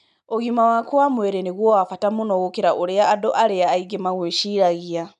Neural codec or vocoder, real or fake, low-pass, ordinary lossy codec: none; real; 10.8 kHz; none